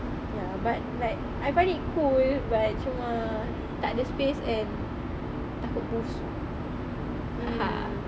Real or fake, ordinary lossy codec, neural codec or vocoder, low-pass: real; none; none; none